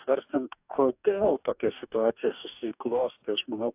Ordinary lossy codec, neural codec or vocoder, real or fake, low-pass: AAC, 32 kbps; codec, 44.1 kHz, 2.6 kbps, DAC; fake; 3.6 kHz